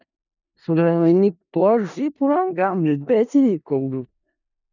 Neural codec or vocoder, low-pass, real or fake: codec, 16 kHz in and 24 kHz out, 0.4 kbps, LongCat-Audio-Codec, four codebook decoder; 7.2 kHz; fake